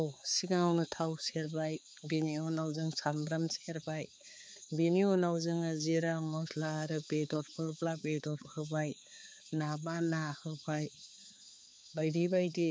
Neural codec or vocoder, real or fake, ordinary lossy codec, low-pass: codec, 16 kHz, 4 kbps, X-Codec, HuBERT features, trained on balanced general audio; fake; none; none